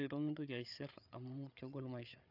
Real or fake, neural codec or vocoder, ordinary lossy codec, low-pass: fake; codec, 16 kHz, 8 kbps, FreqCodec, larger model; none; 5.4 kHz